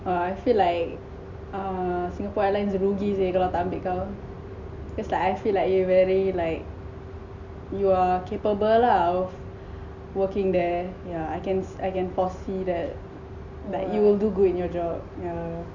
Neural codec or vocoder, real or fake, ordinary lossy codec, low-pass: none; real; none; 7.2 kHz